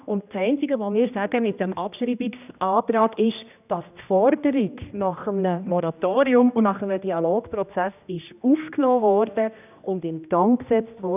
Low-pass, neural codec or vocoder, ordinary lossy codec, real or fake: 3.6 kHz; codec, 16 kHz, 1 kbps, X-Codec, HuBERT features, trained on general audio; none; fake